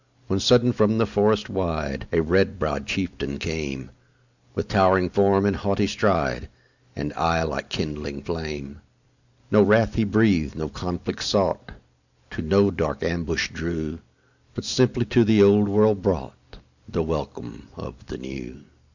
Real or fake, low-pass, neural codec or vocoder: real; 7.2 kHz; none